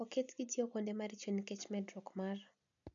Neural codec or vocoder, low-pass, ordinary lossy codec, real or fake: none; 7.2 kHz; none; real